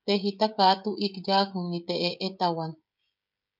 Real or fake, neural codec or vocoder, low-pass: fake; codec, 16 kHz, 16 kbps, FreqCodec, smaller model; 5.4 kHz